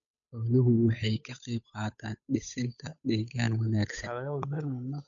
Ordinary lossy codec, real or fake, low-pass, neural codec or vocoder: none; fake; 7.2 kHz; codec, 16 kHz, 8 kbps, FunCodec, trained on Chinese and English, 25 frames a second